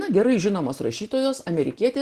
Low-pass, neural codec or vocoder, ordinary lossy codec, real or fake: 14.4 kHz; none; Opus, 16 kbps; real